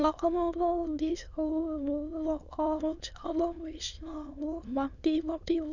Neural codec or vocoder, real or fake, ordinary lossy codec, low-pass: autoencoder, 22.05 kHz, a latent of 192 numbers a frame, VITS, trained on many speakers; fake; none; 7.2 kHz